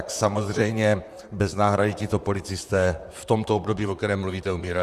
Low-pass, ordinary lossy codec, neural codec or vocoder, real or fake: 14.4 kHz; Opus, 64 kbps; vocoder, 44.1 kHz, 128 mel bands, Pupu-Vocoder; fake